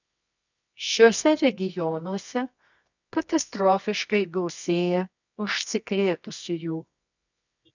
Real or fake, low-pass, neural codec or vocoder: fake; 7.2 kHz; codec, 24 kHz, 0.9 kbps, WavTokenizer, medium music audio release